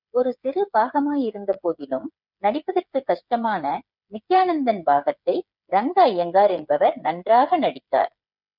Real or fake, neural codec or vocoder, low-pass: fake; codec, 16 kHz, 8 kbps, FreqCodec, smaller model; 5.4 kHz